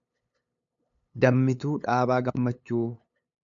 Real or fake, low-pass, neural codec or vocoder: fake; 7.2 kHz; codec, 16 kHz, 8 kbps, FunCodec, trained on LibriTTS, 25 frames a second